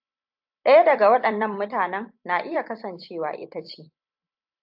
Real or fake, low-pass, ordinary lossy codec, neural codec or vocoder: fake; 5.4 kHz; AAC, 48 kbps; vocoder, 44.1 kHz, 128 mel bands every 256 samples, BigVGAN v2